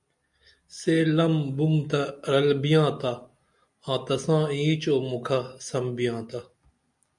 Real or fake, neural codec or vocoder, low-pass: real; none; 10.8 kHz